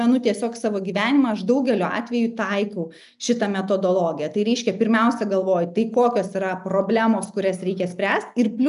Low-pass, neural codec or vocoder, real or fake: 10.8 kHz; none; real